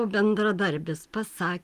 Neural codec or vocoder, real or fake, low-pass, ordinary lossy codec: none; real; 14.4 kHz; Opus, 24 kbps